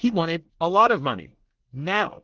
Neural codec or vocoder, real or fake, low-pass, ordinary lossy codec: codec, 24 kHz, 1 kbps, SNAC; fake; 7.2 kHz; Opus, 16 kbps